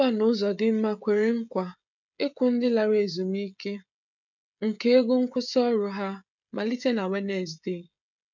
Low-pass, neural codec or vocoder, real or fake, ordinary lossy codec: 7.2 kHz; codec, 16 kHz, 16 kbps, FreqCodec, smaller model; fake; none